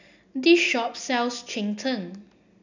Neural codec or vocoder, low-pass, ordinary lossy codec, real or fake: none; 7.2 kHz; none; real